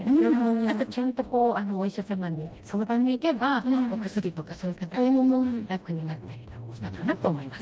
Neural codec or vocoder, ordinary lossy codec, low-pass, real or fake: codec, 16 kHz, 1 kbps, FreqCodec, smaller model; none; none; fake